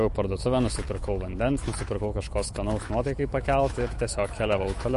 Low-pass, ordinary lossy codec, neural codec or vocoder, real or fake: 14.4 kHz; MP3, 48 kbps; autoencoder, 48 kHz, 128 numbers a frame, DAC-VAE, trained on Japanese speech; fake